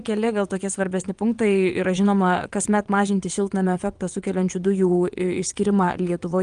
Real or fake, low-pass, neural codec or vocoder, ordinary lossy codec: fake; 9.9 kHz; vocoder, 22.05 kHz, 80 mel bands, WaveNeXt; Opus, 32 kbps